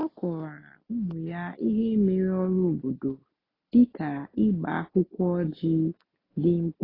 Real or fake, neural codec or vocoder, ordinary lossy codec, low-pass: real; none; AAC, 24 kbps; 5.4 kHz